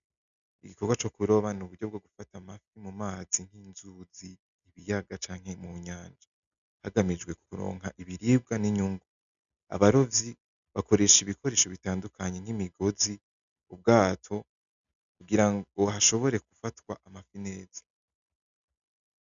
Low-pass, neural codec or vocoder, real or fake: 7.2 kHz; none; real